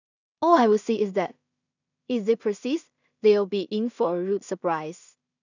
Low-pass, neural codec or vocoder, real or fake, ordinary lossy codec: 7.2 kHz; codec, 16 kHz in and 24 kHz out, 0.4 kbps, LongCat-Audio-Codec, two codebook decoder; fake; none